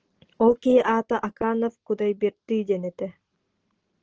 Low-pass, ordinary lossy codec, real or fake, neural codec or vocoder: 7.2 kHz; Opus, 16 kbps; real; none